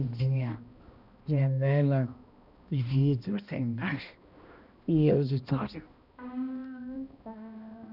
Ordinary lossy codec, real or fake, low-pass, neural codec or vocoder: none; fake; 5.4 kHz; codec, 16 kHz, 1 kbps, X-Codec, HuBERT features, trained on balanced general audio